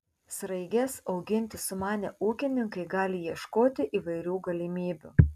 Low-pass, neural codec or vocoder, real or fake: 14.4 kHz; none; real